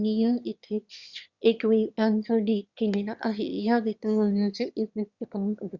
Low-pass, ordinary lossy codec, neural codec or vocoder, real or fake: 7.2 kHz; Opus, 64 kbps; autoencoder, 22.05 kHz, a latent of 192 numbers a frame, VITS, trained on one speaker; fake